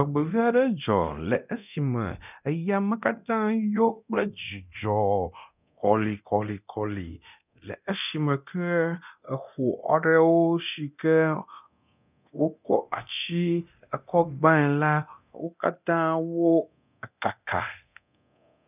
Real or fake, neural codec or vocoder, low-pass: fake; codec, 24 kHz, 0.9 kbps, DualCodec; 3.6 kHz